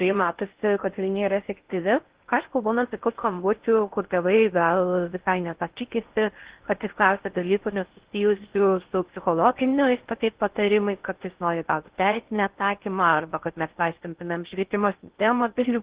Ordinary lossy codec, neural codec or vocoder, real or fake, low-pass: Opus, 16 kbps; codec, 16 kHz in and 24 kHz out, 0.6 kbps, FocalCodec, streaming, 4096 codes; fake; 3.6 kHz